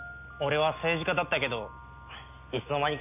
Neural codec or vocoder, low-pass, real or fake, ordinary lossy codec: none; 3.6 kHz; real; none